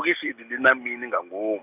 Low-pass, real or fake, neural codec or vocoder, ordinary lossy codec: 3.6 kHz; real; none; none